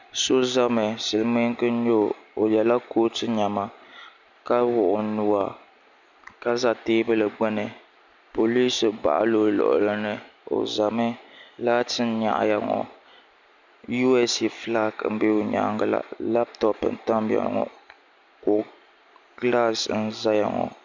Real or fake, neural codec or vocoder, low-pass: real; none; 7.2 kHz